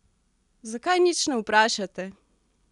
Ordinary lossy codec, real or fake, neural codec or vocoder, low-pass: none; real; none; 10.8 kHz